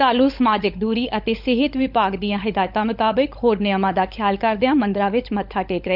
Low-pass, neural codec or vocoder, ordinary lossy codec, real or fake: 5.4 kHz; codec, 16 kHz, 16 kbps, FunCodec, trained on LibriTTS, 50 frames a second; none; fake